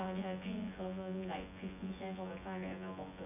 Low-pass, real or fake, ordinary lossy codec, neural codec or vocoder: 3.6 kHz; fake; none; vocoder, 24 kHz, 100 mel bands, Vocos